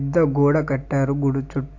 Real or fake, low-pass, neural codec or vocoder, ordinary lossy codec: real; 7.2 kHz; none; AAC, 48 kbps